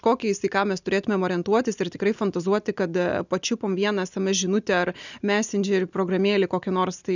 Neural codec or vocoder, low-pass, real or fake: none; 7.2 kHz; real